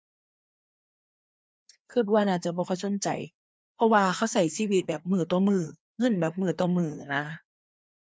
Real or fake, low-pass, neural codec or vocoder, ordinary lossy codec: fake; none; codec, 16 kHz, 2 kbps, FreqCodec, larger model; none